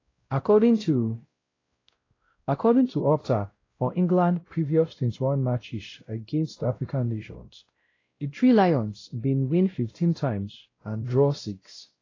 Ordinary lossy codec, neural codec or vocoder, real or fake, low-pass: AAC, 32 kbps; codec, 16 kHz, 0.5 kbps, X-Codec, WavLM features, trained on Multilingual LibriSpeech; fake; 7.2 kHz